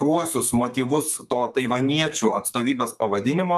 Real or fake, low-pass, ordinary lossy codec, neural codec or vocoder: fake; 14.4 kHz; MP3, 96 kbps; codec, 32 kHz, 1.9 kbps, SNAC